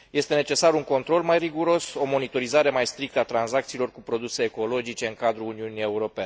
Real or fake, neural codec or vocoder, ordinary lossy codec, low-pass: real; none; none; none